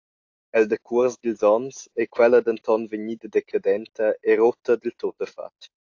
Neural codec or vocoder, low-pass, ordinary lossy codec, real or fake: none; 7.2 kHz; AAC, 48 kbps; real